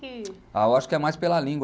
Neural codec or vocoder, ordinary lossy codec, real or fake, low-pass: none; none; real; none